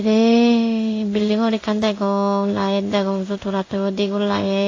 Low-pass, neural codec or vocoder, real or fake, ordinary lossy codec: 7.2 kHz; codec, 16 kHz in and 24 kHz out, 1 kbps, XY-Tokenizer; fake; AAC, 32 kbps